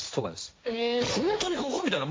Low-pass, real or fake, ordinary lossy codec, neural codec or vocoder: none; fake; none; codec, 16 kHz, 1.1 kbps, Voila-Tokenizer